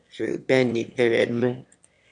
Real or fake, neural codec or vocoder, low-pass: fake; autoencoder, 22.05 kHz, a latent of 192 numbers a frame, VITS, trained on one speaker; 9.9 kHz